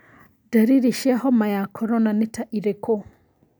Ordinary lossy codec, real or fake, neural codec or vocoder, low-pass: none; real; none; none